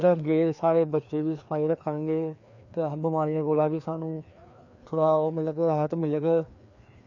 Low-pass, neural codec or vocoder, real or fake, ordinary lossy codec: 7.2 kHz; codec, 16 kHz, 2 kbps, FreqCodec, larger model; fake; none